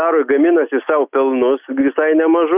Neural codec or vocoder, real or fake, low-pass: none; real; 3.6 kHz